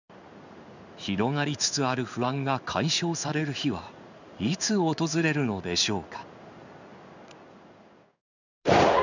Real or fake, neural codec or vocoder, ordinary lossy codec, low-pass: fake; codec, 16 kHz in and 24 kHz out, 1 kbps, XY-Tokenizer; none; 7.2 kHz